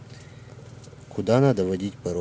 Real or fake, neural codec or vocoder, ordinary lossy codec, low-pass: real; none; none; none